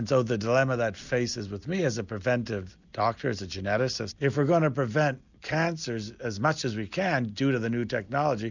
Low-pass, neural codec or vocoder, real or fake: 7.2 kHz; none; real